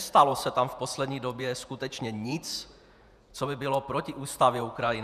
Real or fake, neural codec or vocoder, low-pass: fake; vocoder, 48 kHz, 128 mel bands, Vocos; 14.4 kHz